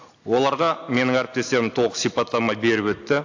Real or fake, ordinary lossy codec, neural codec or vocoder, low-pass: real; AAC, 48 kbps; none; 7.2 kHz